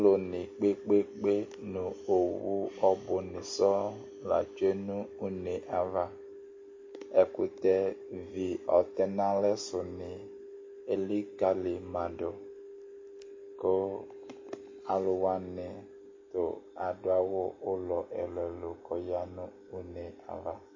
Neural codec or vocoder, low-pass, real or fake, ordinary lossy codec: none; 7.2 kHz; real; MP3, 32 kbps